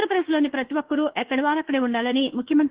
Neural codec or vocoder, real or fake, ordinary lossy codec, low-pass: autoencoder, 48 kHz, 32 numbers a frame, DAC-VAE, trained on Japanese speech; fake; Opus, 16 kbps; 3.6 kHz